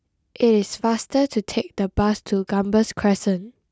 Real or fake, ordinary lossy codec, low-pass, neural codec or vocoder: real; none; none; none